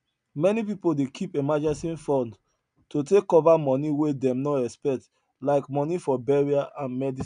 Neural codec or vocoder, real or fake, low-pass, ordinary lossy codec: none; real; 9.9 kHz; none